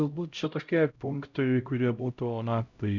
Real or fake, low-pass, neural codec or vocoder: fake; 7.2 kHz; codec, 16 kHz, 0.5 kbps, X-Codec, HuBERT features, trained on LibriSpeech